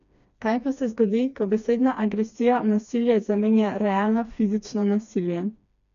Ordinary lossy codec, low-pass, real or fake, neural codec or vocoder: none; 7.2 kHz; fake; codec, 16 kHz, 2 kbps, FreqCodec, smaller model